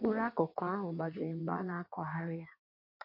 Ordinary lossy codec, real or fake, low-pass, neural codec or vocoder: MP3, 32 kbps; fake; 5.4 kHz; codec, 16 kHz in and 24 kHz out, 1.1 kbps, FireRedTTS-2 codec